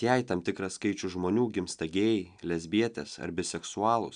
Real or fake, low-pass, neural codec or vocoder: real; 9.9 kHz; none